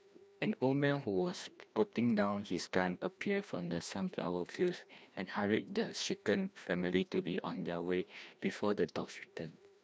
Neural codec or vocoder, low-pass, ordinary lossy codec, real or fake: codec, 16 kHz, 1 kbps, FreqCodec, larger model; none; none; fake